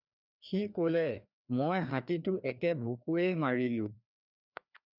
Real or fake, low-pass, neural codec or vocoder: fake; 5.4 kHz; codec, 16 kHz, 2 kbps, FreqCodec, larger model